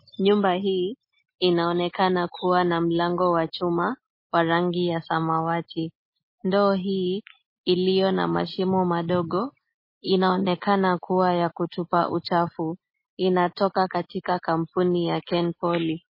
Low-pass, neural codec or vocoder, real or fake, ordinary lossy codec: 5.4 kHz; none; real; MP3, 24 kbps